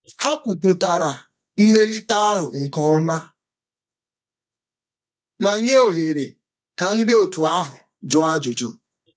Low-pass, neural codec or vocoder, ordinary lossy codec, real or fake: 9.9 kHz; codec, 24 kHz, 0.9 kbps, WavTokenizer, medium music audio release; none; fake